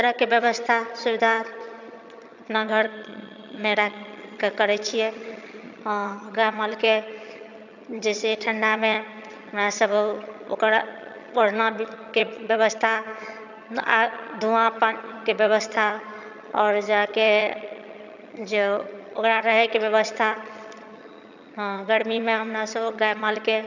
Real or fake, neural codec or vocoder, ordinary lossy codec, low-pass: fake; vocoder, 22.05 kHz, 80 mel bands, HiFi-GAN; none; 7.2 kHz